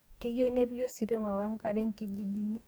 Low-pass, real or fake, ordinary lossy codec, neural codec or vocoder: none; fake; none; codec, 44.1 kHz, 2.6 kbps, DAC